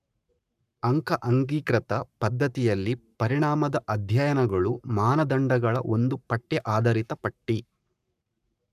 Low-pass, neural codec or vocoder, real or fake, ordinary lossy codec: 14.4 kHz; codec, 44.1 kHz, 7.8 kbps, Pupu-Codec; fake; none